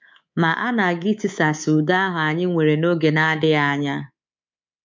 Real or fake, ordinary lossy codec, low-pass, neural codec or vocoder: fake; MP3, 64 kbps; 7.2 kHz; codec, 24 kHz, 3.1 kbps, DualCodec